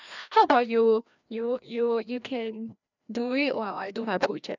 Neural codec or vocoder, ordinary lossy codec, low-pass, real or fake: codec, 16 kHz, 1 kbps, FreqCodec, larger model; none; 7.2 kHz; fake